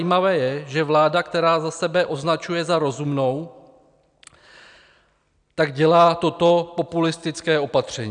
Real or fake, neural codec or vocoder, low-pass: real; none; 9.9 kHz